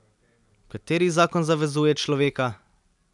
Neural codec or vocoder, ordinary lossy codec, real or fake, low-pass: none; none; real; 10.8 kHz